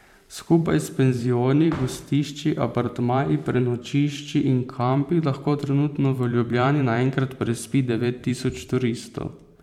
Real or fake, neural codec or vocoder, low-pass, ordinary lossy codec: fake; vocoder, 44.1 kHz, 128 mel bands every 512 samples, BigVGAN v2; 19.8 kHz; MP3, 96 kbps